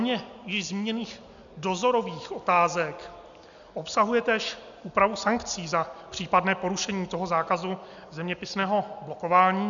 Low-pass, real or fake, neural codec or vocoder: 7.2 kHz; real; none